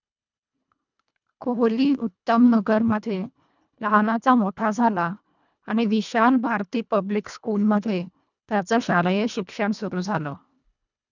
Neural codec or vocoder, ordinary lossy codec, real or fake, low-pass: codec, 24 kHz, 1.5 kbps, HILCodec; none; fake; 7.2 kHz